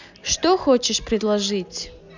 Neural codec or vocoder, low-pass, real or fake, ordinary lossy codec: none; 7.2 kHz; real; none